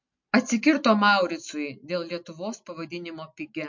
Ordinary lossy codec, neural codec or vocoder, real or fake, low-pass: MP3, 48 kbps; none; real; 7.2 kHz